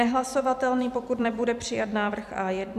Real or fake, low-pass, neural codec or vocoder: fake; 14.4 kHz; vocoder, 44.1 kHz, 128 mel bands every 256 samples, BigVGAN v2